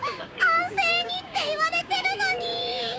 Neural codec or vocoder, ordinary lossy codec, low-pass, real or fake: codec, 16 kHz, 6 kbps, DAC; none; none; fake